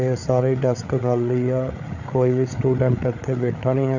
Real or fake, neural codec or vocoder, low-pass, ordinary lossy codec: fake; codec, 16 kHz, 8 kbps, FreqCodec, larger model; 7.2 kHz; none